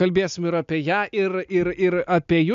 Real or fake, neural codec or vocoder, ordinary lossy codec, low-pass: real; none; MP3, 64 kbps; 7.2 kHz